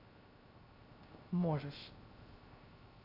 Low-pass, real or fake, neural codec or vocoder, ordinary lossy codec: 5.4 kHz; fake; codec, 16 kHz, 0.3 kbps, FocalCodec; AAC, 32 kbps